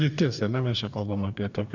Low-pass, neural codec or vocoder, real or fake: 7.2 kHz; codec, 44.1 kHz, 2.6 kbps, DAC; fake